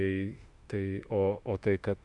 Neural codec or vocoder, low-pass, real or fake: autoencoder, 48 kHz, 32 numbers a frame, DAC-VAE, trained on Japanese speech; 10.8 kHz; fake